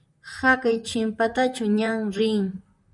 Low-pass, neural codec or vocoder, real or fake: 10.8 kHz; vocoder, 44.1 kHz, 128 mel bands, Pupu-Vocoder; fake